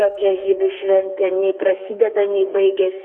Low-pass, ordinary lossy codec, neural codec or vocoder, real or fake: 9.9 kHz; Opus, 64 kbps; codec, 32 kHz, 1.9 kbps, SNAC; fake